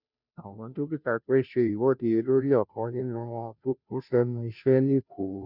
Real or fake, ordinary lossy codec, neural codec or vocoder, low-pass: fake; none; codec, 16 kHz, 0.5 kbps, FunCodec, trained on Chinese and English, 25 frames a second; 5.4 kHz